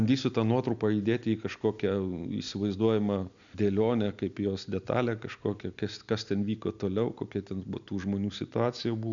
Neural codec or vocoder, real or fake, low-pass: none; real; 7.2 kHz